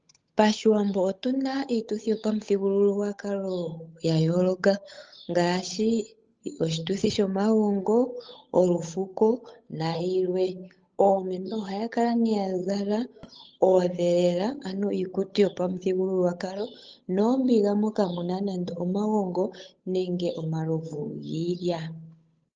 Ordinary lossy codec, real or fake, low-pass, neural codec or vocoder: Opus, 24 kbps; fake; 7.2 kHz; codec, 16 kHz, 8 kbps, FunCodec, trained on Chinese and English, 25 frames a second